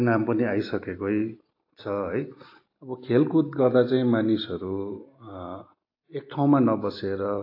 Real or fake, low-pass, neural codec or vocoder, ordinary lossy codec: real; 5.4 kHz; none; AAC, 32 kbps